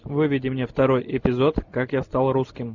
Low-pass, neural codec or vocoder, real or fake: 7.2 kHz; none; real